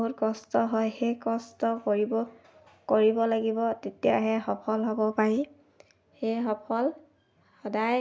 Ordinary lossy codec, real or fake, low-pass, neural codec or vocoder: none; real; none; none